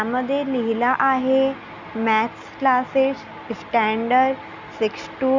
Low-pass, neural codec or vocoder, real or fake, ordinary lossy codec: 7.2 kHz; none; real; Opus, 64 kbps